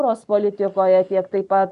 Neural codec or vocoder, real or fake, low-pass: none; real; 10.8 kHz